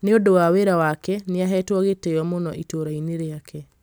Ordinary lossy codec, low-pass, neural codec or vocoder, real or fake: none; none; none; real